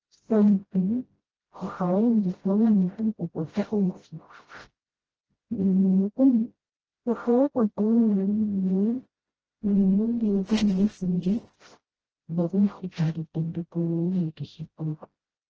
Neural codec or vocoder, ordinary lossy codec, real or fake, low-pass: codec, 16 kHz, 0.5 kbps, FreqCodec, smaller model; Opus, 16 kbps; fake; 7.2 kHz